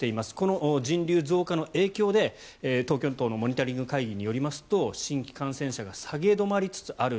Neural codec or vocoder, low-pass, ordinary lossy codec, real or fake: none; none; none; real